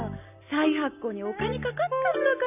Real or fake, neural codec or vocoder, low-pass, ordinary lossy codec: real; none; 3.6 kHz; none